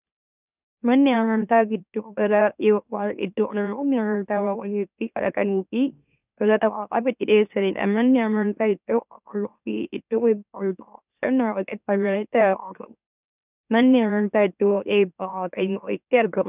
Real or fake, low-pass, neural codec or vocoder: fake; 3.6 kHz; autoencoder, 44.1 kHz, a latent of 192 numbers a frame, MeloTTS